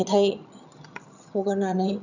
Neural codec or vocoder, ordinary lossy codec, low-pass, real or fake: vocoder, 22.05 kHz, 80 mel bands, HiFi-GAN; none; 7.2 kHz; fake